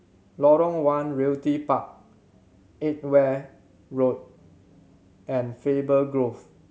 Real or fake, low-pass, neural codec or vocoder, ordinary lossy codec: real; none; none; none